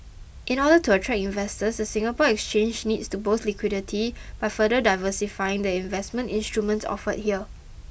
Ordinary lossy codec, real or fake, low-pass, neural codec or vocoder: none; real; none; none